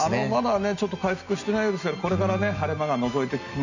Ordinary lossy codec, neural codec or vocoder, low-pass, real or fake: none; none; 7.2 kHz; real